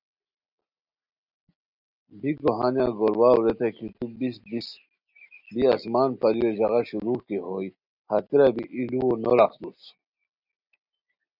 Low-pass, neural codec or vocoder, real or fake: 5.4 kHz; none; real